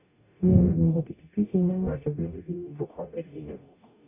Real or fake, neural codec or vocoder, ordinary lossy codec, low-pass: fake; codec, 44.1 kHz, 0.9 kbps, DAC; none; 3.6 kHz